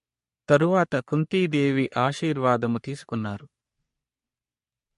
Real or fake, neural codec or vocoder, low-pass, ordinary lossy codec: fake; codec, 44.1 kHz, 3.4 kbps, Pupu-Codec; 14.4 kHz; MP3, 48 kbps